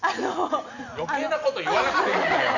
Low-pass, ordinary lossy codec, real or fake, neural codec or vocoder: 7.2 kHz; none; real; none